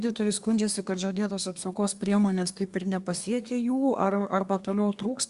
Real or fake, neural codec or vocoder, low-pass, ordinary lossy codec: fake; codec, 24 kHz, 1 kbps, SNAC; 10.8 kHz; Opus, 64 kbps